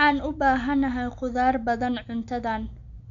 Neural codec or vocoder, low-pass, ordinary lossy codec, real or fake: none; 7.2 kHz; none; real